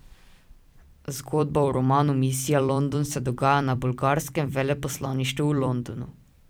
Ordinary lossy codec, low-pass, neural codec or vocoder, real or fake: none; none; vocoder, 44.1 kHz, 128 mel bands every 256 samples, BigVGAN v2; fake